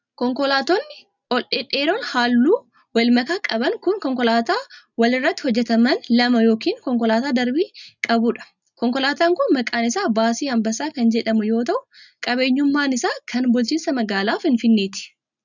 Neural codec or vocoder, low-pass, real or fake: none; 7.2 kHz; real